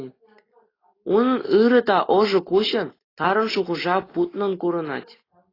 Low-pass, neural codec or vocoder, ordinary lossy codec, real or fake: 5.4 kHz; none; AAC, 24 kbps; real